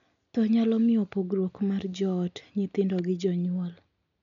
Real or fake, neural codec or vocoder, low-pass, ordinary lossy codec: real; none; 7.2 kHz; none